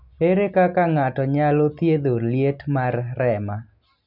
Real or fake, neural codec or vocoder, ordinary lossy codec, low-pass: real; none; none; 5.4 kHz